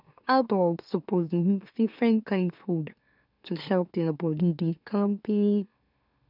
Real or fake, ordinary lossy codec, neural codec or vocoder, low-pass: fake; none; autoencoder, 44.1 kHz, a latent of 192 numbers a frame, MeloTTS; 5.4 kHz